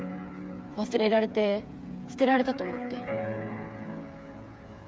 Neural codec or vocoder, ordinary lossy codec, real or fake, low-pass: codec, 16 kHz, 8 kbps, FreqCodec, smaller model; none; fake; none